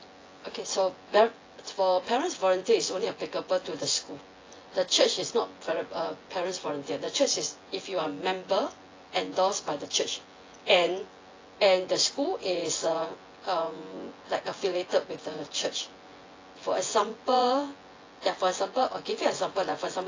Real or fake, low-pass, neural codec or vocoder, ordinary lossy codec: fake; 7.2 kHz; vocoder, 24 kHz, 100 mel bands, Vocos; AAC, 32 kbps